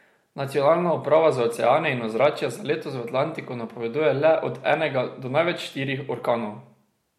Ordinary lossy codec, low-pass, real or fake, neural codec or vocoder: MP3, 64 kbps; 19.8 kHz; real; none